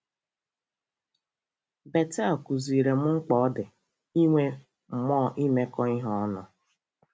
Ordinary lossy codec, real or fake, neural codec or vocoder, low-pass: none; real; none; none